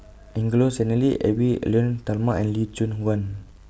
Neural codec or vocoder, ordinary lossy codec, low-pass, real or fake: none; none; none; real